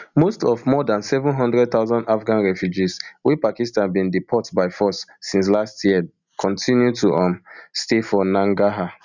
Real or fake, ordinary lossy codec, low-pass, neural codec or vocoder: real; none; 7.2 kHz; none